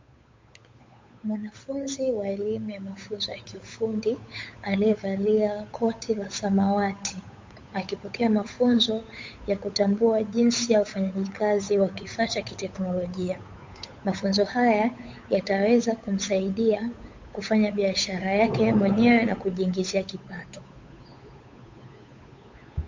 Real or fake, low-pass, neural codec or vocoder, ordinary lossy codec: fake; 7.2 kHz; codec, 16 kHz, 8 kbps, FunCodec, trained on Chinese and English, 25 frames a second; MP3, 48 kbps